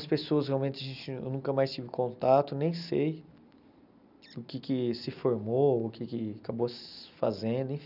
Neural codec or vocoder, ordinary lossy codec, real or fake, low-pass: none; none; real; 5.4 kHz